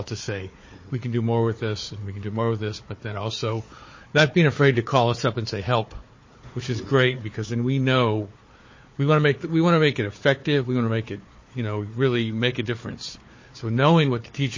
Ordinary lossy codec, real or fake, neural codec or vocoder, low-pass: MP3, 32 kbps; fake; codec, 16 kHz, 4 kbps, FunCodec, trained on Chinese and English, 50 frames a second; 7.2 kHz